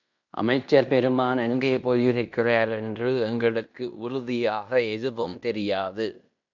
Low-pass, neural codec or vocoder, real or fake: 7.2 kHz; codec, 16 kHz in and 24 kHz out, 0.9 kbps, LongCat-Audio-Codec, fine tuned four codebook decoder; fake